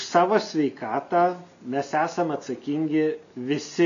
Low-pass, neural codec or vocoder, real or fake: 7.2 kHz; none; real